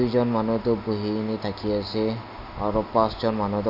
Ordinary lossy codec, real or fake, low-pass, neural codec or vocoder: none; real; 5.4 kHz; none